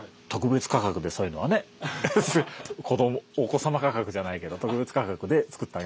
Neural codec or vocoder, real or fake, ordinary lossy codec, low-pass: none; real; none; none